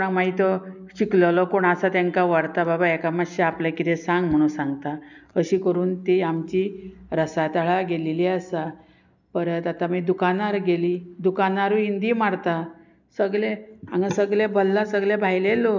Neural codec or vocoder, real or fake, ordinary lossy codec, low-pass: none; real; none; 7.2 kHz